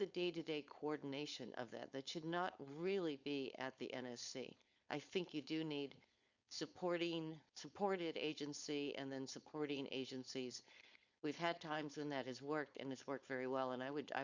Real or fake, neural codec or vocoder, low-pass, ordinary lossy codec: fake; codec, 16 kHz, 4.8 kbps, FACodec; 7.2 kHz; Opus, 64 kbps